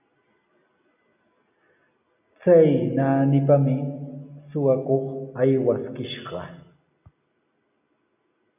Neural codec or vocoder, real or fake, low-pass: none; real; 3.6 kHz